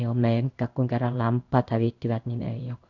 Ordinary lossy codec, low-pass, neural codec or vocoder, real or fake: none; 7.2 kHz; codec, 16 kHz in and 24 kHz out, 1 kbps, XY-Tokenizer; fake